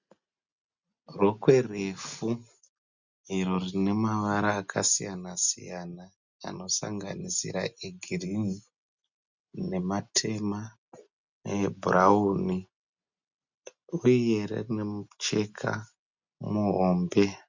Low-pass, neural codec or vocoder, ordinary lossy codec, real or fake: 7.2 kHz; none; Opus, 64 kbps; real